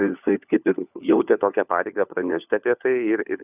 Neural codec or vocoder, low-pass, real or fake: codec, 16 kHz, 4 kbps, FunCodec, trained on LibriTTS, 50 frames a second; 3.6 kHz; fake